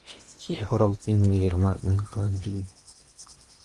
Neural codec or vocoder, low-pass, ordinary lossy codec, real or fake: codec, 16 kHz in and 24 kHz out, 0.8 kbps, FocalCodec, streaming, 65536 codes; 10.8 kHz; Opus, 32 kbps; fake